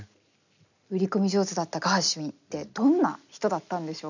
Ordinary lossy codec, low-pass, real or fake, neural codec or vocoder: none; 7.2 kHz; real; none